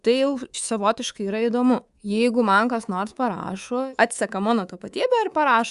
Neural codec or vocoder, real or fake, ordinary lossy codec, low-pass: codec, 24 kHz, 3.1 kbps, DualCodec; fake; Opus, 64 kbps; 10.8 kHz